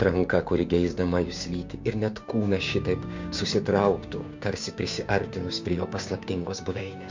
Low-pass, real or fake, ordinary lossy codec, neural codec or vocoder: 7.2 kHz; fake; MP3, 64 kbps; codec, 16 kHz, 6 kbps, DAC